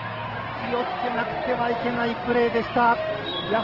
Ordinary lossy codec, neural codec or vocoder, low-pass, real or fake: Opus, 16 kbps; none; 5.4 kHz; real